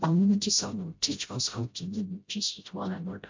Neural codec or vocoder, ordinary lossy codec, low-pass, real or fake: codec, 16 kHz, 0.5 kbps, FreqCodec, smaller model; MP3, 48 kbps; 7.2 kHz; fake